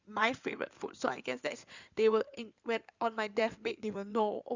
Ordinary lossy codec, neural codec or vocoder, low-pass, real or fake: Opus, 64 kbps; codec, 16 kHz in and 24 kHz out, 2.2 kbps, FireRedTTS-2 codec; 7.2 kHz; fake